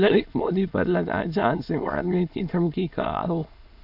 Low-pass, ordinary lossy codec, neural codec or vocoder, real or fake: 5.4 kHz; AAC, 48 kbps; autoencoder, 22.05 kHz, a latent of 192 numbers a frame, VITS, trained on many speakers; fake